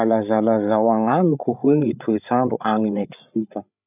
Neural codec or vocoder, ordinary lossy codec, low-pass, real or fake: codec, 16 kHz, 8 kbps, FreqCodec, larger model; none; 3.6 kHz; fake